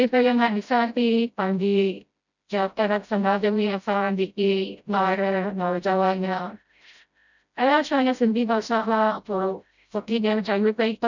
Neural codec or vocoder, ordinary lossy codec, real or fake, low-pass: codec, 16 kHz, 0.5 kbps, FreqCodec, smaller model; none; fake; 7.2 kHz